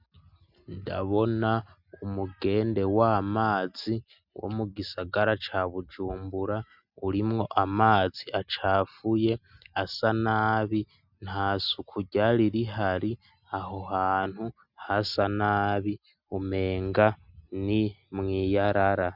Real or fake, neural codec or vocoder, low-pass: real; none; 5.4 kHz